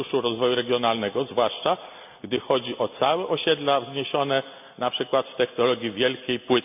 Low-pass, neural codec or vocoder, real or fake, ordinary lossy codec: 3.6 kHz; none; real; none